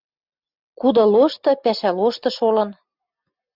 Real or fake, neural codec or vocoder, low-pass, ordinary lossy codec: real; none; 5.4 kHz; Opus, 64 kbps